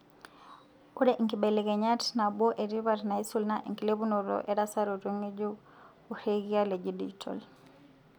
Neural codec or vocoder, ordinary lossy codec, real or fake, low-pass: none; none; real; 19.8 kHz